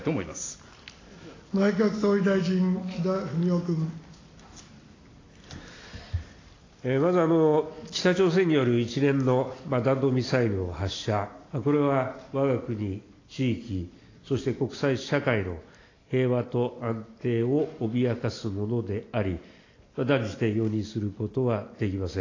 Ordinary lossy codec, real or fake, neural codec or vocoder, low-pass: AAC, 32 kbps; real; none; 7.2 kHz